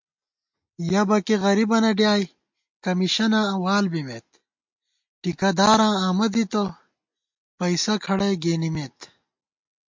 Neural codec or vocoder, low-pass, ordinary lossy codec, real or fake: none; 7.2 kHz; MP3, 48 kbps; real